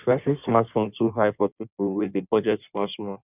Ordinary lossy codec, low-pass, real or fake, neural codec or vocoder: none; 3.6 kHz; fake; codec, 16 kHz in and 24 kHz out, 1.1 kbps, FireRedTTS-2 codec